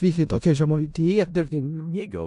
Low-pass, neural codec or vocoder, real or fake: 10.8 kHz; codec, 16 kHz in and 24 kHz out, 0.4 kbps, LongCat-Audio-Codec, four codebook decoder; fake